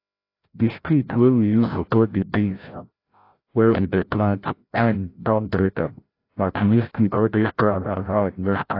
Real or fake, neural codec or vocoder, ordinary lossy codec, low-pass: fake; codec, 16 kHz, 0.5 kbps, FreqCodec, larger model; AAC, 32 kbps; 5.4 kHz